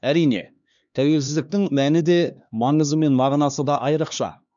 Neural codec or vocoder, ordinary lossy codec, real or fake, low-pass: codec, 16 kHz, 2 kbps, X-Codec, HuBERT features, trained on LibriSpeech; none; fake; 7.2 kHz